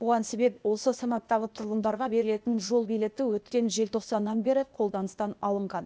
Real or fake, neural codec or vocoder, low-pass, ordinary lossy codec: fake; codec, 16 kHz, 0.8 kbps, ZipCodec; none; none